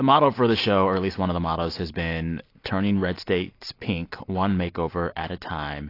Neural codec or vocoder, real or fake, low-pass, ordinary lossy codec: none; real; 5.4 kHz; AAC, 32 kbps